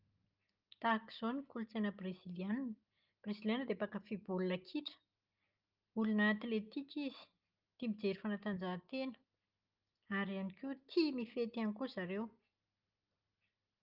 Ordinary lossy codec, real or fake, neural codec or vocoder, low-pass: Opus, 32 kbps; real; none; 5.4 kHz